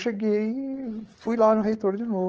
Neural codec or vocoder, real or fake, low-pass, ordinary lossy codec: codec, 16 kHz, 8 kbps, FreqCodec, larger model; fake; 7.2 kHz; Opus, 16 kbps